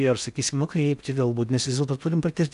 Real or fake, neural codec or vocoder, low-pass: fake; codec, 16 kHz in and 24 kHz out, 0.6 kbps, FocalCodec, streaming, 2048 codes; 10.8 kHz